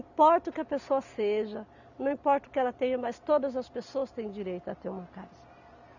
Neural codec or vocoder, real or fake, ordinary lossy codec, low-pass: none; real; none; 7.2 kHz